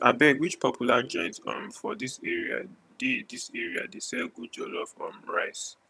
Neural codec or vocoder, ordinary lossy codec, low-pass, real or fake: vocoder, 22.05 kHz, 80 mel bands, HiFi-GAN; none; none; fake